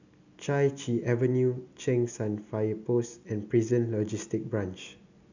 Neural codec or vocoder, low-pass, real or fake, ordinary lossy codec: none; 7.2 kHz; real; none